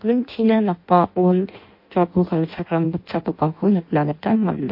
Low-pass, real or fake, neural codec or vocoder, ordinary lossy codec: 5.4 kHz; fake; codec, 16 kHz in and 24 kHz out, 0.6 kbps, FireRedTTS-2 codec; MP3, 32 kbps